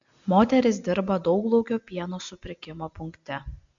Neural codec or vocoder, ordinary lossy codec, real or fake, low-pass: none; MP3, 64 kbps; real; 7.2 kHz